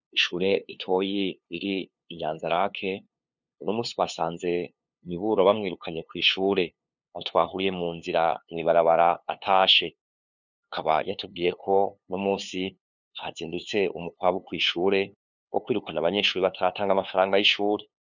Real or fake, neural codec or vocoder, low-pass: fake; codec, 16 kHz, 2 kbps, FunCodec, trained on LibriTTS, 25 frames a second; 7.2 kHz